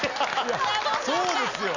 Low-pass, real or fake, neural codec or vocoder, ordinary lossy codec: 7.2 kHz; real; none; none